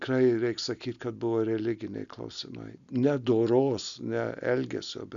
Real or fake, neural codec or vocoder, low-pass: real; none; 7.2 kHz